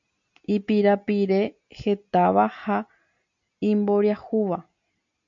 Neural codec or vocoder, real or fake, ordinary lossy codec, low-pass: none; real; MP3, 96 kbps; 7.2 kHz